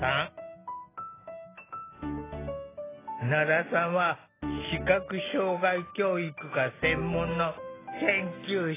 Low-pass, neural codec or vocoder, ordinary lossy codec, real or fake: 3.6 kHz; none; AAC, 16 kbps; real